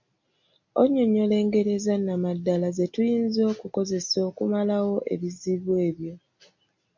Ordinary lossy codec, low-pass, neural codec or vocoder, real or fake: MP3, 64 kbps; 7.2 kHz; none; real